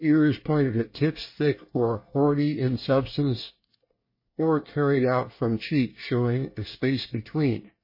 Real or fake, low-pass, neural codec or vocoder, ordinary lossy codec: fake; 5.4 kHz; codec, 24 kHz, 1 kbps, SNAC; MP3, 24 kbps